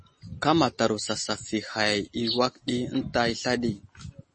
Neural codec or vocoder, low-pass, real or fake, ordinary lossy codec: none; 10.8 kHz; real; MP3, 32 kbps